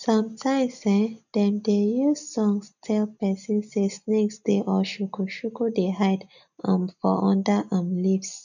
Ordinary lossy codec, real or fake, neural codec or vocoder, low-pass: none; real; none; 7.2 kHz